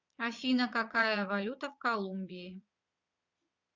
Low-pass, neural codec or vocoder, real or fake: 7.2 kHz; vocoder, 22.05 kHz, 80 mel bands, Vocos; fake